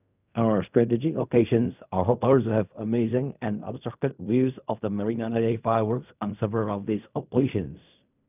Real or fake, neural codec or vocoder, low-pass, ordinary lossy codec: fake; codec, 16 kHz in and 24 kHz out, 0.4 kbps, LongCat-Audio-Codec, fine tuned four codebook decoder; 3.6 kHz; none